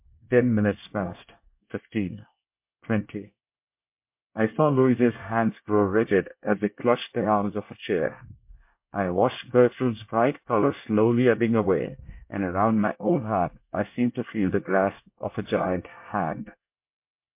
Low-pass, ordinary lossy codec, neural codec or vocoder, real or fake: 3.6 kHz; MP3, 32 kbps; codec, 24 kHz, 1 kbps, SNAC; fake